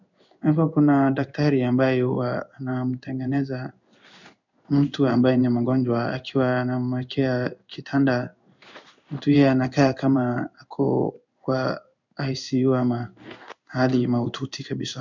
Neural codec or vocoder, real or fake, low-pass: codec, 16 kHz in and 24 kHz out, 1 kbps, XY-Tokenizer; fake; 7.2 kHz